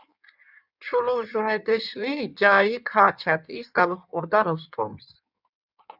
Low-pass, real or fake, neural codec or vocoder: 5.4 kHz; fake; codec, 16 kHz in and 24 kHz out, 1.1 kbps, FireRedTTS-2 codec